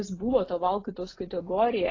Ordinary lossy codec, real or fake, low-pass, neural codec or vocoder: AAC, 32 kbps; real; 7.2 kHz; none